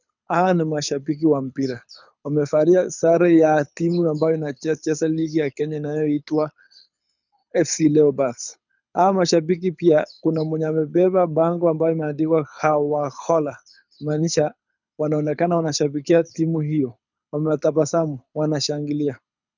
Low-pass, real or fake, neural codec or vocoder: 7.2 kHz; fake; codec, 24 kHz, 6 kbps, HILCodec